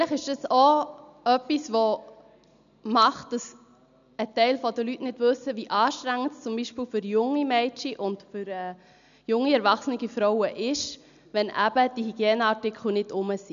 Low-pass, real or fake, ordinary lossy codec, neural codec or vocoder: 7.2 kHz; real; none; none